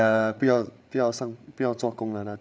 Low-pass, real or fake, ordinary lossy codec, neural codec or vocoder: none; fake; none; codec, 16 kHz, 8 kbps, FreqCodec, larger model